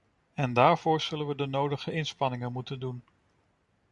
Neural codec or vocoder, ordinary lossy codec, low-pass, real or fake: none; MP3, 96 kbps; 10.8 kHz; real